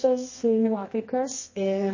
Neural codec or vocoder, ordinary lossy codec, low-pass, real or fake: codec, 24 kHz, 0.9 kbps, WavTokenizer, medium music audio release; MP3, 32 kbps; 7.2 kHz; fake